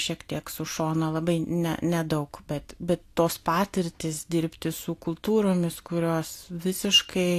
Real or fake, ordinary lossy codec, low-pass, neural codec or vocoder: real; AAC, 64 kbps; 14.4 kHz; none